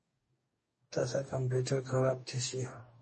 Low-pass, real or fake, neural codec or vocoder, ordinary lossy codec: 10.8 kHz; fake; codec, 44.1 kHz, 2.6 kbps, DAC; MP3, 32 kbps